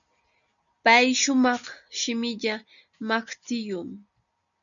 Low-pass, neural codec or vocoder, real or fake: 7.2 kHz; none; real